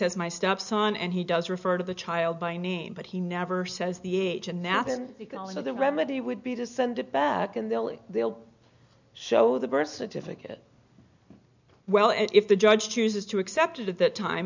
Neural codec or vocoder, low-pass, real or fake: none; 7.2 kHz; real